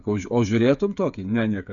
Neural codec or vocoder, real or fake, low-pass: codec, 16 kHz, 8 kbps, FreqCodec, smaller model; fake; 7.2 kHz